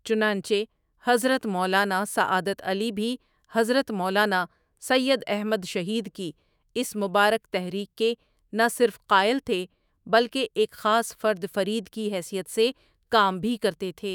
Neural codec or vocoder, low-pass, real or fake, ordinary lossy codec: autoencoder, 48 kHz, 128 numbers a frame, DAC-VAE, trained on Japanese speech; none; fake; none